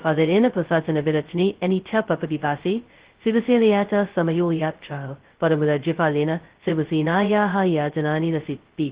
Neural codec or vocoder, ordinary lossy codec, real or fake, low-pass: codec, 16 kHz, 0.2 kbps, FocalCodec; Opus, 16 kbps; fake; 3.6 kHz